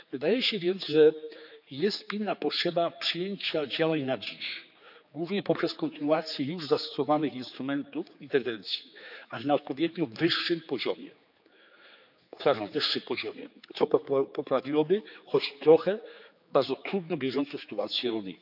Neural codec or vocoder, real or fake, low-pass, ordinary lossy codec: codec, 16 kHz, 4 kbps, X-Codec, HuBERT features, trained on general audio; fake; 5.4 kHz; none